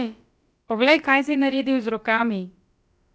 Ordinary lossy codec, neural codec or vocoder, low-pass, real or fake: none; codec, 16 kHz, about 1 kbps, DyCAST, with the encoder's durations; none; fake